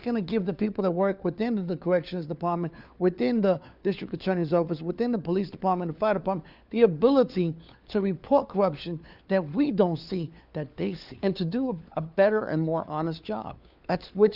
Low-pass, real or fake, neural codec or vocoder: 5.4 kHz; fake; codec, 16 kHz, 4 kbps, FunCodec, trained on LibriTTS, 50 frames a second